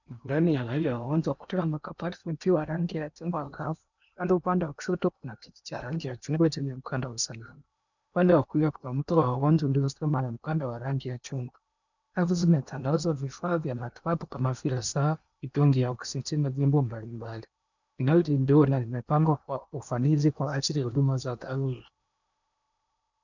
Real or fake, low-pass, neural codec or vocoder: fake; 7.2 kHz; codec, 16 kHz in and 24 kHz out, 0.8 kbps, FocalCodec, streaming, 65536 codes